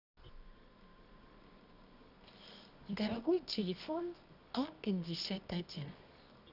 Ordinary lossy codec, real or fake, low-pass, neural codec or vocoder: none; fake; 5.4 kHz; codec, 24 kHz, 0.9 kbps, WavTokenizer, medium music audio release